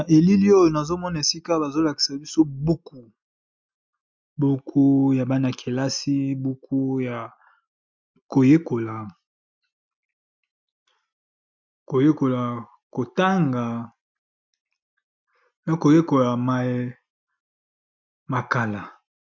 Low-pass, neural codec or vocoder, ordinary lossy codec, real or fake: 7.2 kHz; none; MP3, 64 kbps; real